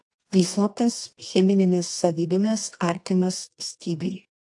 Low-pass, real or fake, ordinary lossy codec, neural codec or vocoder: 10.8 kHz; fake; AAC, 64 kbps; codec, 24 kHz, 0.9 kbps, WavTokenizer, medium music audio release